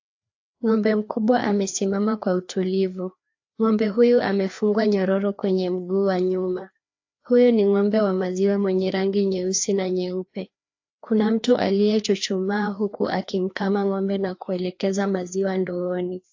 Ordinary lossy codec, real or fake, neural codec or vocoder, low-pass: AAC, 48 kbps; fake; codec, 16 kHz, 2 kbps, FreqCodec, larger model; 7.2 kHz